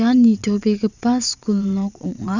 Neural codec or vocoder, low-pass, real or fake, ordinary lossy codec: vocoder, 22.05 kHz, 80 mel bands, WaveNeXt; 7.2 kHz; fake; none